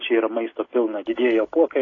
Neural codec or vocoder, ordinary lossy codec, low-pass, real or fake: none; AAC, 32 kbps; 7.2 kHz; real